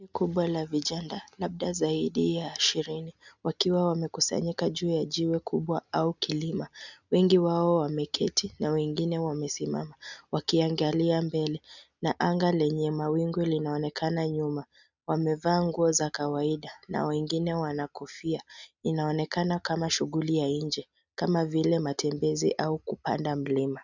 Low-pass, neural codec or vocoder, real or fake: 7.2 kHz; none; real